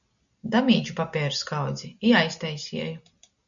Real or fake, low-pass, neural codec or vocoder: real; 7.2 kHz; none